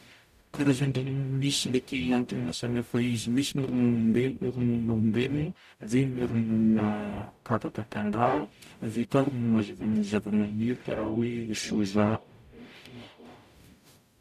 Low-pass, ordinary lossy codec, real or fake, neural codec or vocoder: 14.4 kHz; none; fake; codec, 44.1 kHz, 0.9 kbps, DAC